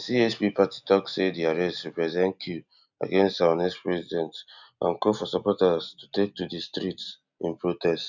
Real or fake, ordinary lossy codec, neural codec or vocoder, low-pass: real; none; none; 7.2 kHz